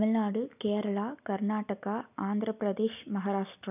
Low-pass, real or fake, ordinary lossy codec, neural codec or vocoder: 3.6 kHz; real; none; none